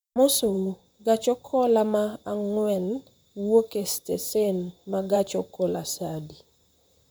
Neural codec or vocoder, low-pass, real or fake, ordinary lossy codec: vocoder, 44.1 kHz, 128 mel bands, Pupu-Vocoder; none; fake; none